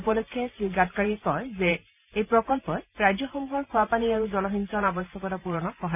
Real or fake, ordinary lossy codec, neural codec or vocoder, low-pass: fake; none; vocoder, 44.1 kHz, 128 mel bands every 512 samples, BigVGAN v2; 3.6 kHz